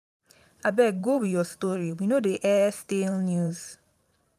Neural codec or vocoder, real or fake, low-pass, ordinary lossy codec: vocoder, 44.1 kHz, 128 mel bands every 512 samples, BigVGAN v2; fake; 14.4 kHz; none